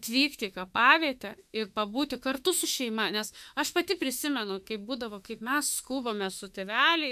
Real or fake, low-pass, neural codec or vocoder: fake; 14.4 kHz; autoencoder, 48 kHz, 32 numbers a frame, DAC-VAE, trained on Japanese speech